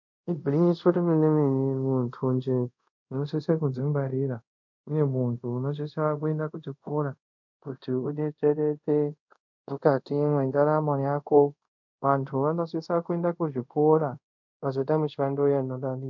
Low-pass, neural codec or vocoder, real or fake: 7.2 kHz; codec, 24 kHz, 0.5 kbps, DualCodec; fake